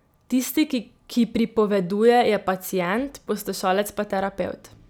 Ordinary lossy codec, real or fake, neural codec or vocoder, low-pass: none; real; none; none